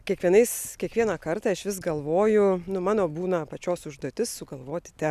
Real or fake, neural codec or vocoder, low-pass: real; none; 14.4 kHz